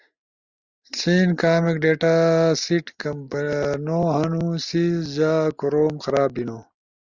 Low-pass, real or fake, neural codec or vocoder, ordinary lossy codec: 7.2 kHz; real; none; Opus, 64 kbps